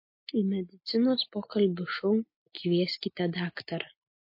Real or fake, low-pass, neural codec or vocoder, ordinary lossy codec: real; 5.4 kHz; none; MP3, 32 kbps